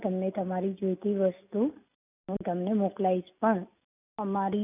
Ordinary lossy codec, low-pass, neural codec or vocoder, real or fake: none; 3.6 kHz; none; real